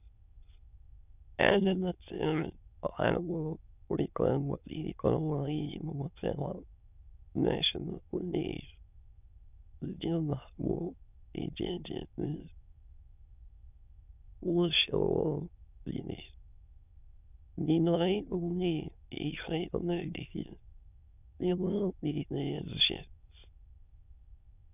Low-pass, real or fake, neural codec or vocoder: 3.6 kHz; fake; autoencoder, 22.05 kHz, a latent of 192 numbers a frame, VITS, trained on many speakers